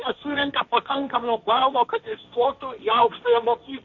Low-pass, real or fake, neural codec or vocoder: 7.2 kHz; fake; codec, 16 kHz, 1.1 kbps, Voila-Tokenizer